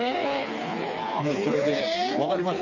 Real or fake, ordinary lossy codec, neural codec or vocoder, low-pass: fake; none; codec, 16 kHz, 4 kbps, FreqCodec, smaller model; 7.2 kHz